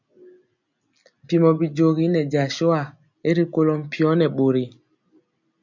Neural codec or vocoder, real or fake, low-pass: none; real; 7.2 kHz